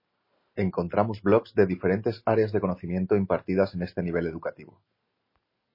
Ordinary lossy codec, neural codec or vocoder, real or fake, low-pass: MP3, 24 kbps; none; real; 5.4 kHz